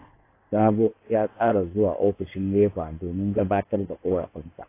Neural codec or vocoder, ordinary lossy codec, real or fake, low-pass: codec, 16 kHz in and 24 kHz out, 2.2 kbps, FireRedTTS-2 codec; AAC, 24 kbps; fake; 3.6 kHz